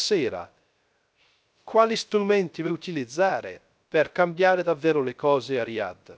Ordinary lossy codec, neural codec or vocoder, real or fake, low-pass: none; codec, 16 kHz, 0.3 kbps, FocalCodec; fake; none